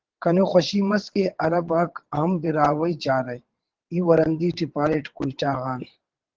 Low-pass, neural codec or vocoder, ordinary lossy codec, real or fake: 7.2 kHz; vocoder, 22.05 kHz, 80 mel bands, WaveNeXt; Opus, 16 kbps; fake